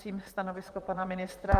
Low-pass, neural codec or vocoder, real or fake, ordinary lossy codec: 14.4 kHz; vocoder, 44.1 kHz, 128 mel bands, Pupu-Vocoder; fake; Opus, 32 kbps